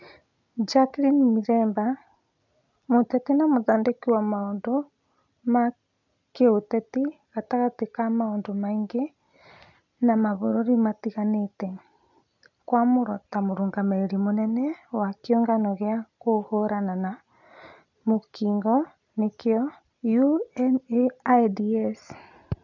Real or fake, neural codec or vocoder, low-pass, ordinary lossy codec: real; none; 7.2 kHz; none